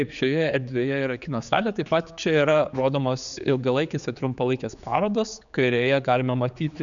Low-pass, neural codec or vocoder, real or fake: 7.2 kHz; codec, 16 kHz, 4 kbps, X-Codec, HuBERT features, trained on general audio; fake